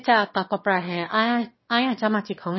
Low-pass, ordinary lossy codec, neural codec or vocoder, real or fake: 7.2 kHz; MP3, 24 kbps; autoencoder, 22.05 kHz, a latent of 192 numbers a frame, VITS, trained on one speaker; fake